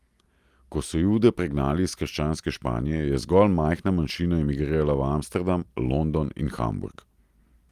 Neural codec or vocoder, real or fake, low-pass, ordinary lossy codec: none; real; 14.4 kHz; Opus, 32 kbps